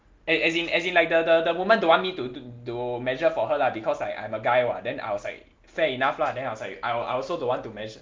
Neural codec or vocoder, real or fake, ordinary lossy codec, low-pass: none; real; Opus, 32 kbps; 7.2 kHz